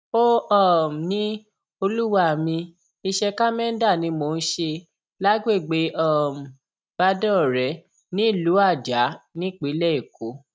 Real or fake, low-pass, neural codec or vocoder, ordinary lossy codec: real; none; none; none